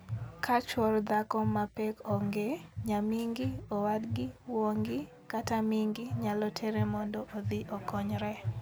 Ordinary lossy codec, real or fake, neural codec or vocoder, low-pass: none; real; none; none